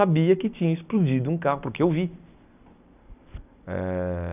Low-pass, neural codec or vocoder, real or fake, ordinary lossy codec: 3.6 kHz; none; real; none